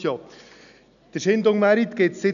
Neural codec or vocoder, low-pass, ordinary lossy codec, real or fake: none; 7.2 kHz; none; real